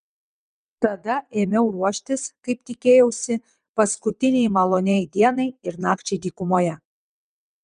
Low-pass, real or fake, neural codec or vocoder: 9.9 kHz; fake; vocoder, 22.05 kHz, 80 mel bands, WaveNeXt